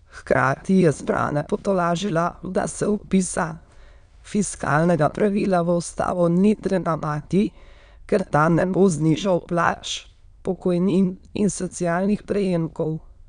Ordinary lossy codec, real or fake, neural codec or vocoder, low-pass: none; fake; autoencoder, 22.05 kHz, a latent of 192 numbers a frame, VITS, trained on many speakers; 9.9 kHz